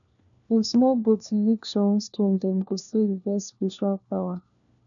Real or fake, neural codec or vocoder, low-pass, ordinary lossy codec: fake; codec, 16 kHz, 1 kbps, FunCodec, trained on LibriTTS, 50 frames a second; 7.2 kHz; none